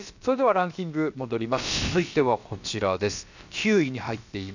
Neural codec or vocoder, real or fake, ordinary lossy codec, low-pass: codec, 16 kHz, about 1 kbps, DyCAST, with the encoder's durations; fake; none; 7.2 kHz